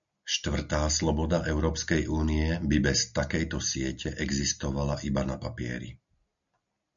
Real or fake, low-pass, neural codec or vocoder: real; 7.2 kHz; none